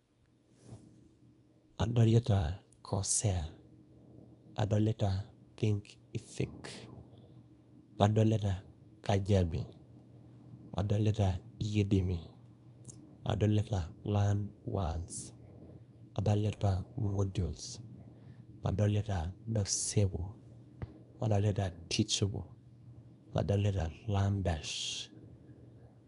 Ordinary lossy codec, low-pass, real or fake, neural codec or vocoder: none; 10.8 kHz; fake; codec, 24 kHz, 0.9 kbps, WavTokenizer, small release